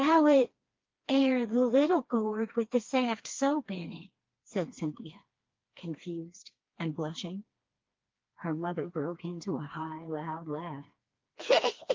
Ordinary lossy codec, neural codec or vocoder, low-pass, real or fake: Opus, 32 kbps; codec, 16 kHz, 2 kbps, FreqCodec, smaller model; 7.2 kHz; fake